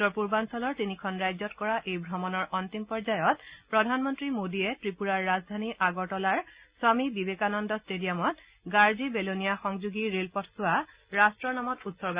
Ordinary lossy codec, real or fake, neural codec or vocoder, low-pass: Opus, 64 kbps; real; none; 3.6 kHz